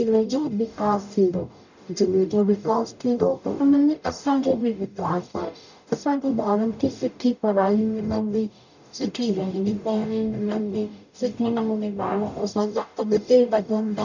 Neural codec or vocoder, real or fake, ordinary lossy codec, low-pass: codec, 44.1 kHz, 0.9 kbps, DAC; fake; none; 7.2 kHz